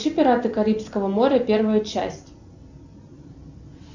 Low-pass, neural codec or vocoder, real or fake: 7.2 kHz; none; real